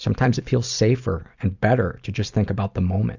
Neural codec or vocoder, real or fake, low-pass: none; real; 7.2 kHz